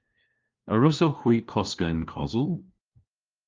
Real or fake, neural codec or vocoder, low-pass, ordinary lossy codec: fake; codec, 16 kHz, 1 kbps, FunCodec, trained on LibriTTS, 50 frames a second; 7.2 kHz; Opus, 32 kbps